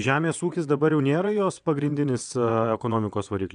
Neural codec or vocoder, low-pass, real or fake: vocoder, 22.05 kHz, 80 mel bands, WaveNeXt; 9.9 kHz; fake